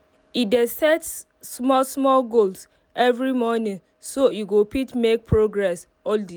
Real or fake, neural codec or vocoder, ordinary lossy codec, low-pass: real; none; none; none